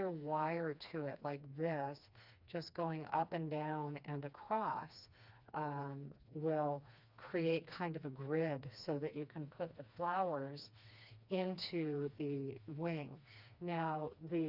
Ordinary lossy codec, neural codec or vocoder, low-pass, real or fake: Opus, 64 kbps; codec, 16 kHz, 2 kbps, FreqCodec, smaller model; 5.4 kHz; fake